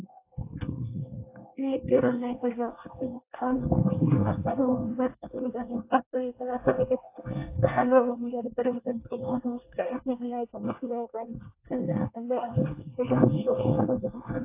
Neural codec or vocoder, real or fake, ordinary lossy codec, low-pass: codec, 24 kHz, 1 kbps, SNAC; fake; AAC, 24 kbps; 3.6 kHz